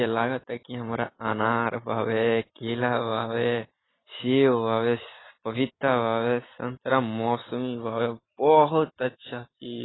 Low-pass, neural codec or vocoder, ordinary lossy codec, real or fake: 7.2 kHz; none; AAC, 16 kbps; real